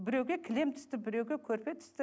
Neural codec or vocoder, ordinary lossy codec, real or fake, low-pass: none; none; real; none